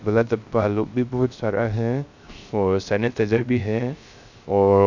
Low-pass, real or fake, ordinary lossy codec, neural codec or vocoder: 7.2 kHz; fake; none; codec, 16 kHz, 0.3 kbps, FocalCodec